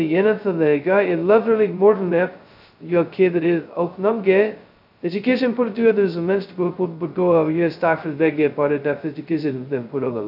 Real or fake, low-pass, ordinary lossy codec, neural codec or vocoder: fake; 5.4 kHz; AAC, 48 kbps; codec, 16 kHz, 0.2 kbps, FocalCodec